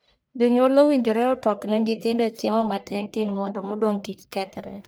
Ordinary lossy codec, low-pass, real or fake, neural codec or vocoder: none; none; fake; codec, 44.1 kHz, 1.7 kbps, Pupu-Codec